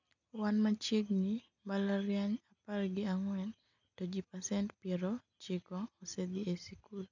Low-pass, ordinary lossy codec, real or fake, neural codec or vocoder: 7.2 kHz; none; real; none